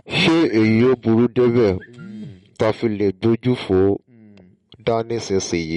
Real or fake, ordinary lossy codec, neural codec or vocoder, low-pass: real; MP3, 48 kbps; none; 10.8 kHz